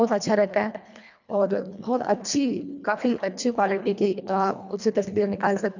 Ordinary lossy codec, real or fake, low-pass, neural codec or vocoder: none; fake; 7.2 kHz; codec, 24 kHz, 1.5 kbps, HILCodec